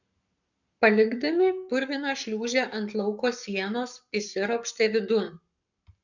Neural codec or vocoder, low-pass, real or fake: codec, 44.1 kHz, 7.8 kbps, Pupu-Codec; 7.2 kHz; fake